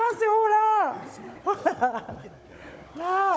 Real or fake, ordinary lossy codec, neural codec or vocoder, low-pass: fake; none; codec, 16 kHz, 16 kbps, FunCodec, trained on Chinese and English, 50 frames a second; none